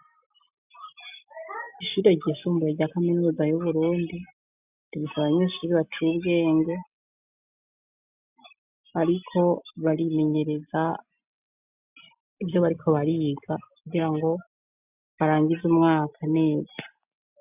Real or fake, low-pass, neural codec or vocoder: real; 3.6 kHz; none